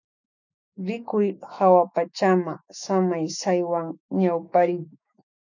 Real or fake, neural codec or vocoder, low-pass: fake; autoencoder, 48 kHz, 128 numbers a frame, DAC-VAE, trained on Japanese speech; 7.2 kHz